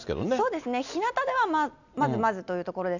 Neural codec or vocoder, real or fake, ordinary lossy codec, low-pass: none; real; none; 7.2 kHz